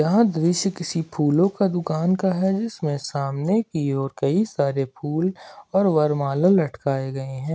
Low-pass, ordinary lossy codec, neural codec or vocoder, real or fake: none; none; none; real